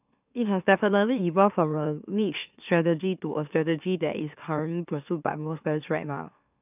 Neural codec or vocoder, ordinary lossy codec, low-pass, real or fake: autoencoder, 44.1 kHz, a latent of 192 numbers a frame, MeloTTS; none; 3.6 kHz; fake